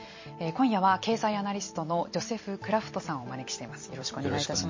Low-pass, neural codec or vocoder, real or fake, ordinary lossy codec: 7.2 kHz; vocoder, 44.1 kHz, 128 mel bands every 256 samples, BigVGAN v2; fake; MP3, 32 kbps